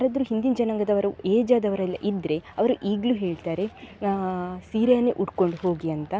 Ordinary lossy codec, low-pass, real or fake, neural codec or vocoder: none; none; real; none